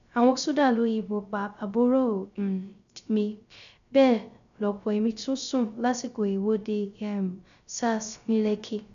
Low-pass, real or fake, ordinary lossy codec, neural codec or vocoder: 7.2 kHz; fake; none; codec, 16 kHz, 0.3 kbps, FocalCodec